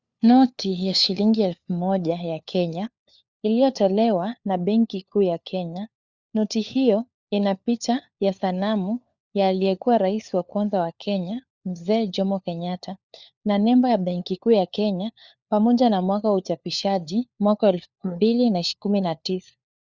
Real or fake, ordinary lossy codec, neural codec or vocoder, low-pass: fake; Opus, 64 kbps; codec, 16 kHz, 4 kbps, FunCodec, trained on LibriTTS, 50 frames a second; 7.2 kHz